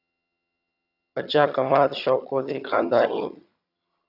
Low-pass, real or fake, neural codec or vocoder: 5.4 kHz; fake; vocoder, 22.05 kHz, 80 mel bands, HiFi-GAN